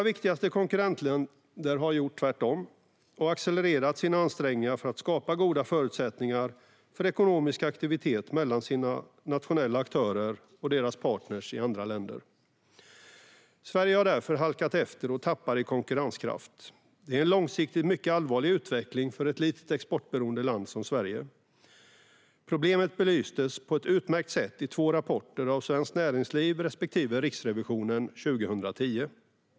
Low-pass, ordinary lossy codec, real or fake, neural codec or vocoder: none; none; real; none